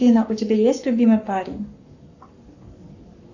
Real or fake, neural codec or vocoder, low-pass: fake; codec, 44.1 kHz, 7.8 kbps, Pupu-Codec; 7.2 kHz